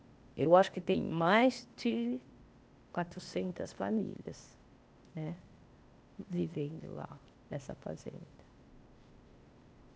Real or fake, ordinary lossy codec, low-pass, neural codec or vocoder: fake; none; none; codec, 16 kHz, 0.8 kbps, ZipCodec